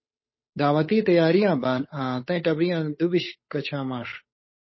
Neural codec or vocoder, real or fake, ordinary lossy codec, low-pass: codec, 16 kHz, 8 kbps, FunCodec, trained on Chinese and English, 25 frames a second; fake; MP3, 24 kbps; 7.2 kHz